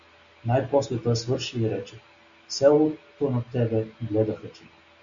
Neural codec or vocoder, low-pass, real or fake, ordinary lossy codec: none; 7.2 kHz; real; AAC, 64 kbps